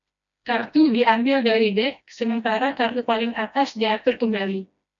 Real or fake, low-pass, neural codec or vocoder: fake; 7.2 kHz; codec, 16 kHz, 1 kbps, FreqCodec, smaller model